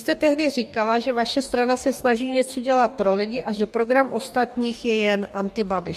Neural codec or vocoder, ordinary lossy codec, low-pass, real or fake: codec, 44.1 kHz, 2.6 kbps, DAC; MP3, 64 kbps; 14.4 kHz; fake